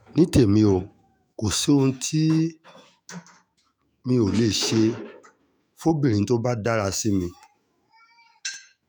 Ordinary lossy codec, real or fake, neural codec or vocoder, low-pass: none; fake; autoencoder, 48 kHz, 128 numbers a frame, DAC-VAE, trained on Japanese speech; none